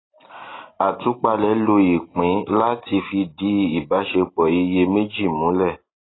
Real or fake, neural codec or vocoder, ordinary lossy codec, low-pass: real; none; AAC, 16 kbps; 7.2 kHz